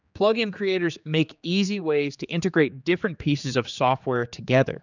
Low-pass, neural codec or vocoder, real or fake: 7.2 kHz; codec, 16 kHz, 4 kbps, X-Codec, HuBERT features, trained on general audio; fake